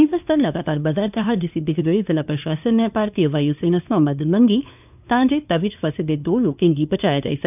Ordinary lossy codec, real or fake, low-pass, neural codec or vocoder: none; fake; 3.6 kHz; codec, 16 kHz, 2 kbps, FunCodec, trained on LibriTTS, 25 frames a second